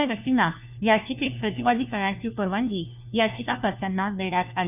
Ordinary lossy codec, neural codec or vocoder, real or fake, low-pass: none; codec, 16 kHz, 1 kbps, FunCodec, trained on LibriTTS, 50 frames a second; fake; 3.6 kHz